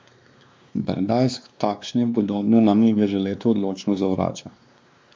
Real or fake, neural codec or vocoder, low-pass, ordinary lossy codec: fake; codec, 16 kHz, 2 kbps, X-Codec, WavLM features, trained on Multilingual LibriSpeech; none; none